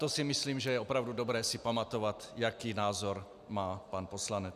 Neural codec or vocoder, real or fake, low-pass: none; real; 14.4 kHz